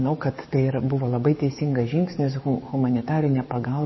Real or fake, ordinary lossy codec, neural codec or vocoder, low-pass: fake; MP3, 24 kbps; codec, 44.1 kHz, 7.8 kbps, DAC; 7.2 kHz